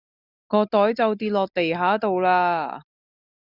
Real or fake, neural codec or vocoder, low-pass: real; none; 5.4 kHz